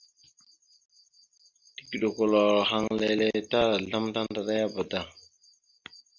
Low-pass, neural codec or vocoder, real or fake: 7.2 kHz; none; real